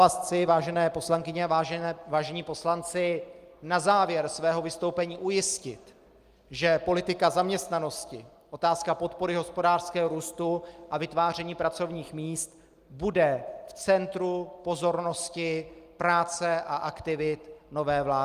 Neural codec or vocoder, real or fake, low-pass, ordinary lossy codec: none; real; 14.4 kHz; Opus, 32 kbps